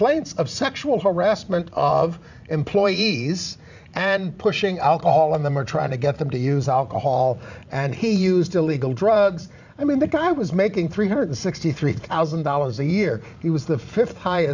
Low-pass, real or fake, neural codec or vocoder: 7.2 kHz; fake; vocoder, 44.1 kHz, 80 mel bands, Vocos